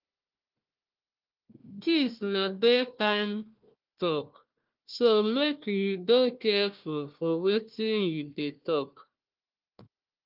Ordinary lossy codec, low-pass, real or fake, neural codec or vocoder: Opus, 32 kbps; 5.4 kHz; fake; codec, 16 kHz, 1 kbps, FunCodec, trained on Chinese and English, 50 frames a second